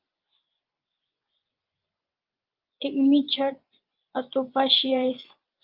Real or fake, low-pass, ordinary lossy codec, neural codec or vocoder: fake; 5.4 kHz; Opus, 32 kbps; vocoder, 24 kHz, 100 mel bands, Vocos